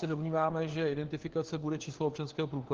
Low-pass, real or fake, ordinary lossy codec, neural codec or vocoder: 7.2 kHz; fake; Opus, 16 kbps; codec, 16 kHz, 4 kbps, FunCodec, trained on LibriTTS, 50 frames a second